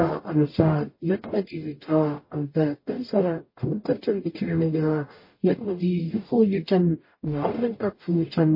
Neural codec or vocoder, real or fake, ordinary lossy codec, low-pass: codec, 44.1 kHz, 0.9 kbps, DAC; fake; MP3, 24 kbps; 5.4 kHz